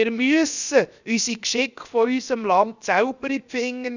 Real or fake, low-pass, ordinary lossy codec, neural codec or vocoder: fake; 7.2 kHz; none; codec, 16 kHz, 0.7 kbps, FocalCodec